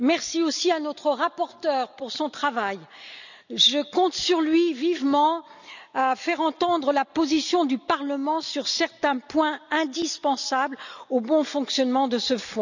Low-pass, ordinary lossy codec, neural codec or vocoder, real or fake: 7.2 kHz; none; none; real